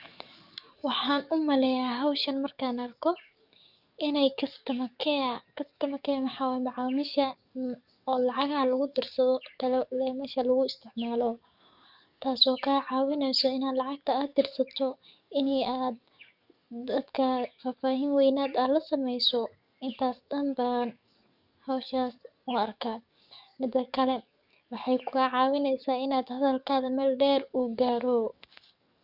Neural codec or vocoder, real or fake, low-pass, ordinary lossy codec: codec, 44.1 kHz, 7.8 kbps, DAC; fake; 5.4 kHz; none